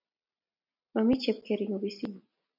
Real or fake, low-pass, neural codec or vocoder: real; 5.4 kHz; none